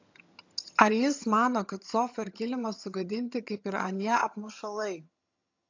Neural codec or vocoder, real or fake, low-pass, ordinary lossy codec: vocoder, 22.05 kHz, 80 mel bands, HiFi-GAN; fake; 7.2 kHz; AAC, 48 kbps